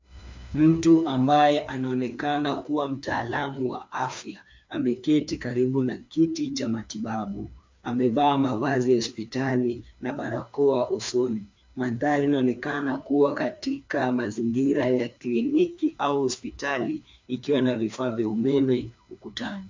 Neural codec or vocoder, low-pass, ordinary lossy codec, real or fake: codec, 16 kHz, 2 kbps, FreqCodec, larger model; 7.2 kHz; MP3, 64 kbps; fake